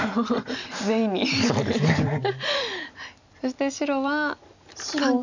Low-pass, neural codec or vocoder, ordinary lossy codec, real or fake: 7.2 kHz; none; none; real